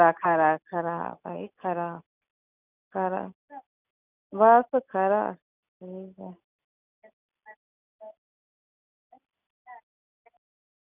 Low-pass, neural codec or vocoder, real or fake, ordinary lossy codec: 3.6 kHz; none; real; none